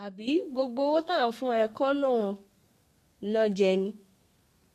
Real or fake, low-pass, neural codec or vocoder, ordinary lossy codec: fake; 14.4 kHz; codec, 32 kHz, 1.9 kbps, SNAC; MP3, 64 kbps